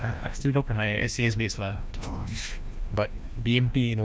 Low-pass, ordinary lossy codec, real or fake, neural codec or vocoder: none; none; fake; codec, 16 kHz, 1 kbps, FreqCodec, larger model